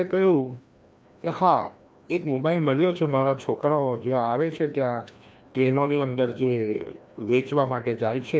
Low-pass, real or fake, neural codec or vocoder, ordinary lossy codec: none; fake; codec, 16 kHz, 1 kbps, FreqCodec, larger model; none